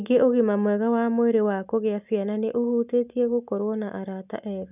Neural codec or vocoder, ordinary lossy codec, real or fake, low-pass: none; none; real; 3.6 kHz